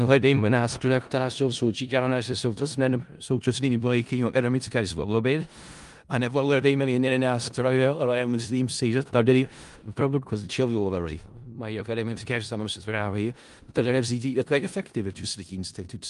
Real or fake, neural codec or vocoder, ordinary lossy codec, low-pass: fake; codec, 16 kHz in and 24 kHz out, 0.4 kbps, LongCat-Audio-Codec, four codebook decoder; Opus, 32 kbps; 10.8 kHz